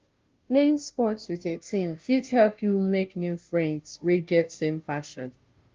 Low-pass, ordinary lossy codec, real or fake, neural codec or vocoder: 7.2 kHz; Opus, 32 kbps; fake; codec, 16 kHz, 0.5 kbps, FunCodec, trained on Chinese and English, 25 frames a second